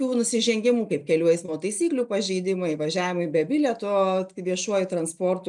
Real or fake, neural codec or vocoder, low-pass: real; none; 10.8 kHz